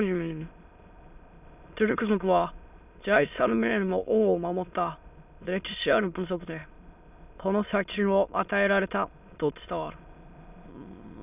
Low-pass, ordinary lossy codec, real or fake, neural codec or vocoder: 3.6 kHz; none; fake; autoencoder, 22.05 kHz, a latent of 192 numbers a frame, VITS, trained on many speakers